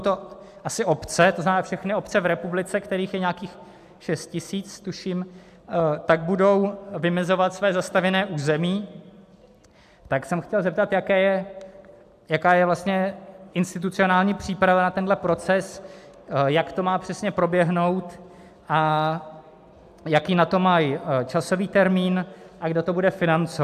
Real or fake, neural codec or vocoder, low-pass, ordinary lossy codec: real; none; 14.4 kHz; AAC, 96 kbps